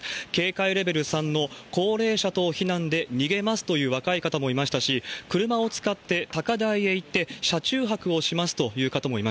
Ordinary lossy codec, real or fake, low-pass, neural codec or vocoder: none; real; none; none